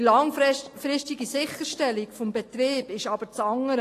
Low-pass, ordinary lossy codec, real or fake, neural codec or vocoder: 14.4 kHz; AAC, 48 kbps; real; none